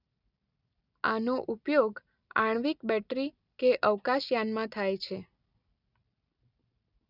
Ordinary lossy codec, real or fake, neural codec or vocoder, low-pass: none; real; none; 5.4 kHz